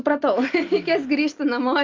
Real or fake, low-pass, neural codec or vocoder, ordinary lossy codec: real; 7.2 kHz; none; Opus, 24 kbps